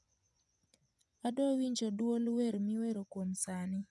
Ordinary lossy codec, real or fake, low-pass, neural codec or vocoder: none; fake; none; vocoder, 24 kHz, 100 mel bands, Vocos